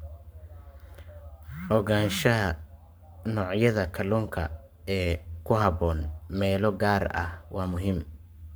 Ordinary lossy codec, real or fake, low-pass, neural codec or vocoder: none; fake; none; codec, 44.1 kHz, 7.8 kbps, Pupu-Codec